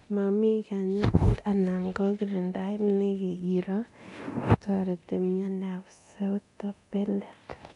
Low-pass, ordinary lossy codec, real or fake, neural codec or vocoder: 10.8 kHz; none; fake; codec, 24 kHz, 0.9 kbps, DualCodec